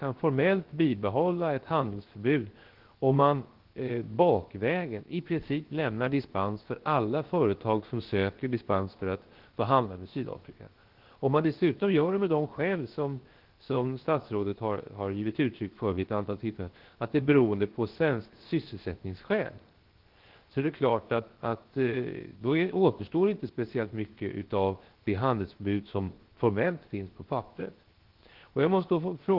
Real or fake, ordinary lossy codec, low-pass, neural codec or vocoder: fake; Opus, 16 kbps; 5.4 kHz; codec, 16 kHz, 0.7 kbps, FocalCodec